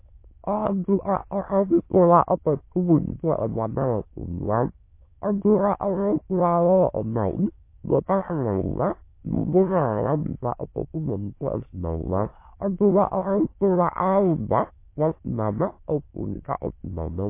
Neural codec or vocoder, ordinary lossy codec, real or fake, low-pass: autoencoder, 22.05 kHz, a latent of 192 numbers a frame, VITS, trained on many speakers; MP3, 24 kbps; fake; 3.6 kHz